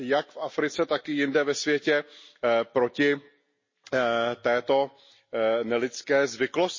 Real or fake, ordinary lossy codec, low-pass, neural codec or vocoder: real; MP3, 32 kbps; 7.2 kHz; none